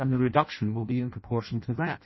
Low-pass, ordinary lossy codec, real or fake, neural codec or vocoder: 7.2 kHz; MP3, 24 kbps; fake; codec, 16 kHz in and 24 kHz out, 0.6 kbps, FireRedTTS-2 codec